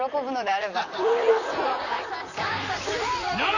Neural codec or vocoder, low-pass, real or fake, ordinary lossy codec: vocoder, 44.1 kHz, 128 mel bands, Pupu-Vocoder; 7.2 kHz; fake; none